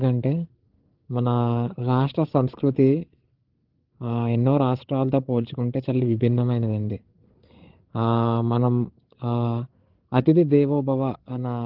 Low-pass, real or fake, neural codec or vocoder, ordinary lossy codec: 5.4 kHz; fake; codec, 16 kHz, 8 kbps, FreqCodec, larger model; Opus, 16 kbps